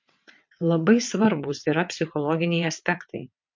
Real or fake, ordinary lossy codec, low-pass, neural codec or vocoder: fake; MP3, 48 kbps; 7.2 kHz; vocoder, 22.05 kHz, 80 mel bands, WaveNeXt